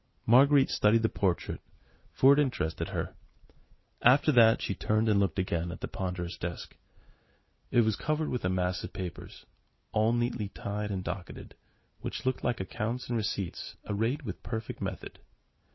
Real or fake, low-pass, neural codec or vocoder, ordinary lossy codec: real; 7.2 kHz; none; MP3, 24 kbps